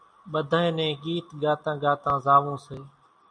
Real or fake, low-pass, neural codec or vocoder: real; 9.9 kHz; none